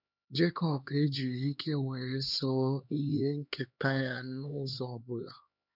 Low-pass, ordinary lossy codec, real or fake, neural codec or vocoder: 5.4 kHz; none; fake; codec, 16 kHz, 2 kbps, X-Codec, HuBERT features, trained on LibriSpeech